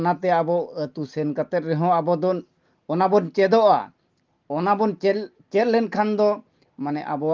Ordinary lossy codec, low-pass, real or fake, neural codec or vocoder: Opus, 32 kbps; 7.2 kHz; real; none